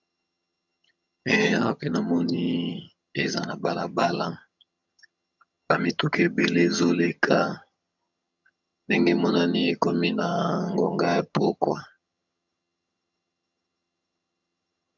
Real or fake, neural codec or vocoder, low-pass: fake; vocoder, 22.05 kHz, 80 mel bands, HiFi-GAN; 7.2 kHz